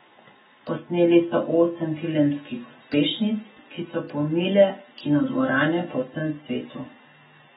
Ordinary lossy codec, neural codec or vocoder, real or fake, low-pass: AAC, 16 kbps; none; real; 7.2 kHz